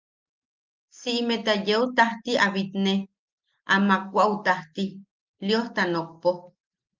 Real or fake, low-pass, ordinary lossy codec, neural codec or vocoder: real; 7.2 kHz; Opus, 24 kbps; none